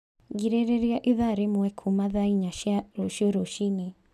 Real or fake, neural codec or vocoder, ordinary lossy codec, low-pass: real; none; none; 14.4 kHz